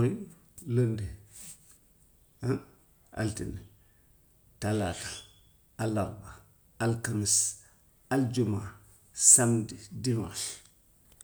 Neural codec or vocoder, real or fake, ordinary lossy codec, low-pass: none; real; none; none